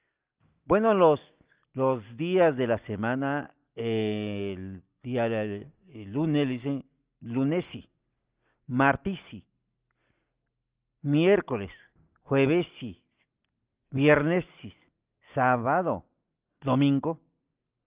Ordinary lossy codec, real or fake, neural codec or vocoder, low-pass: Opus, 32 kbps; real; none; 3.6 kHz